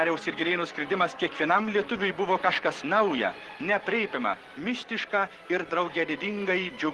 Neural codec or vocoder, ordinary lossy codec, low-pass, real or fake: vocoder, 24 kHz, 100 mel bands, Vocos; Opus, 16 kbps; 10.8 kHz; fake